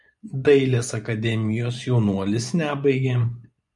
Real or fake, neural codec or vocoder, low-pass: fake; vocoder, 24 kHz, 100 mel bands, Vocos; 10.8 kHz